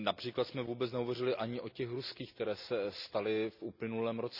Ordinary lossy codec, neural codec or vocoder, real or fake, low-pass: none; none; real; 5.4 kHz